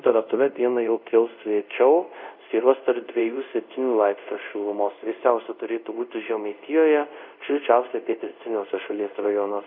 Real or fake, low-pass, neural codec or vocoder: fake; 5.4 kHz; codec, 24 kHz, 0.5 kbps, DualCodec